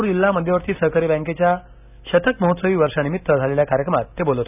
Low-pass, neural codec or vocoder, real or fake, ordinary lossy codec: 3.6 kHz; none; real; none